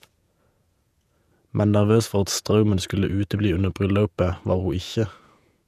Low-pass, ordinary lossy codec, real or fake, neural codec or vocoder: 14.4 kHz; none; fake; vocoder, 48 kHz, 128 mel bands, Vocos